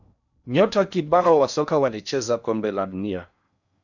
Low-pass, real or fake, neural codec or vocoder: 7.2 kHz; fake; codec, 16 kHz in and 24 kHz out, 0.8 kbps, FocalCodec, streaming, 65536 codes